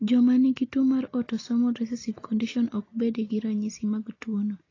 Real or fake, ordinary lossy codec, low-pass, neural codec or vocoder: real; AAC, 32 kbps; 7.2 kHz; none